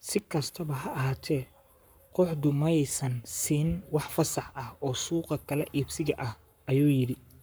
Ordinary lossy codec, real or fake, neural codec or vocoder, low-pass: none; fake; codec, 44.1 kHz, 7.8 kbps, Pupu-Codec; none